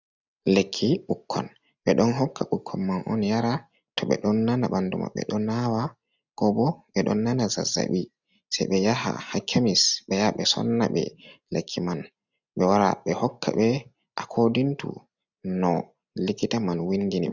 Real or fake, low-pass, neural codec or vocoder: real; 7.2 kHz; none